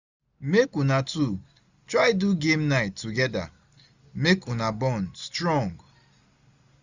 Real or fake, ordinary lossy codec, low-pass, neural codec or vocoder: real; none; 7.2 kHz; none